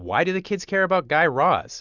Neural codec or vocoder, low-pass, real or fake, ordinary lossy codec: none; 7.2 kHz; real; Opus, 64 kbps